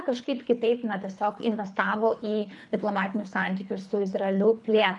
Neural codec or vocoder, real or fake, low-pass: codec, 24 kHz, 3 kbps, HILCodec; fake; 10.8 kHz